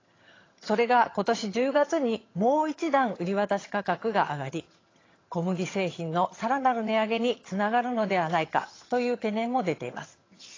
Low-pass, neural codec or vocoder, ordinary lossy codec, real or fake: 7.2 kHz; vocoder, 22.05 kHz, 80 mel bands, HiFi-GAN; AAC, 32 kbps; fake